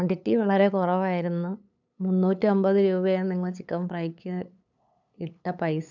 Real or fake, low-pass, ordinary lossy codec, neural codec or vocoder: fake; 7.2 kHz; none; codec, 16 kHz, 8 kbps, FunCodec, trained on LibriTTS, 25 frames a second